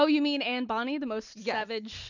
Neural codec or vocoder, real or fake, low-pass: none; real; 7.2 kHz